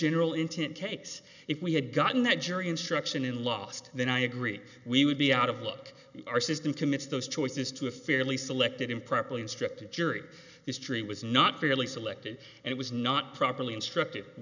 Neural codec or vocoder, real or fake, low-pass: none; real; 7.2 kHz